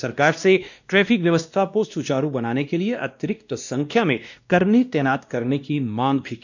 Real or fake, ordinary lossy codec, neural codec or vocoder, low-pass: fake; none; codec, 16 kHz, 1 kbps, X-Codec, WavLM features, trained on Multilingual LibriSpeech; 7.2 kHz